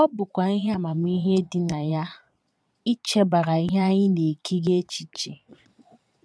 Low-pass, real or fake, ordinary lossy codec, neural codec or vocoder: none; fake; none; vocoder, 22.05 kHz, 80 mel bands, Vocos